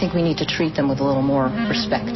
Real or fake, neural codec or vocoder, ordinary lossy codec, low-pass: real; none; MP3, 24 kbps; 7.2 kHz